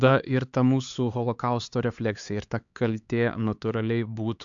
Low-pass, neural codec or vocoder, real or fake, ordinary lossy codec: 7.2 kHz; codec, 16 kHz, 4 kbps, X-Codec, HuBERT features, trained on LibriSpeech; fake; AAC, 64 kbps